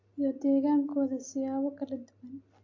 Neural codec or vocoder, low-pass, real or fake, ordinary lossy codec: none; 7.2 kHz; real; AAC, 48 kbps